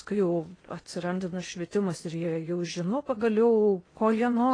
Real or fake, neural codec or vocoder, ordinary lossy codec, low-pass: fake; codec, 16 kHz in and 24 kHz out, 0.8 kbps, FocalCodec, streaming, 65536 codes; AAC, 32 kbps; 9.9 kHz